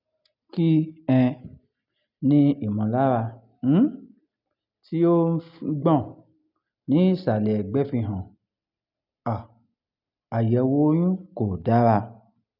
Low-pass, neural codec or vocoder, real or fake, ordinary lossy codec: 5.4 kHz; none; real; none